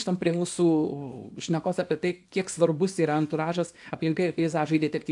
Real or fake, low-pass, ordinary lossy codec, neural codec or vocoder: fake; 10.8 kHz; AAC, 64 kbps; codec, 24 kHz, 0.9 kbps, WavTokenizer, small release